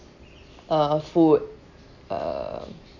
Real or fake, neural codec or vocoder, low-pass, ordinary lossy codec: real; none; 7.2 kHz; none